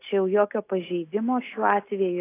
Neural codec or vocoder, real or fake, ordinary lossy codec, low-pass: none; real; AAC, 24 kbps; 3.6 kHz